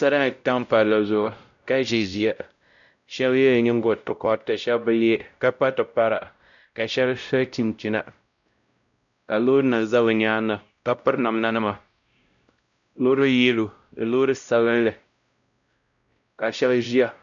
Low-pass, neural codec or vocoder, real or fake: 7.2 kHz; codec, 16 kHz, 0.5 kbps, X-Codec, WavLM features, trained on Multilingual LibriSpeech; fake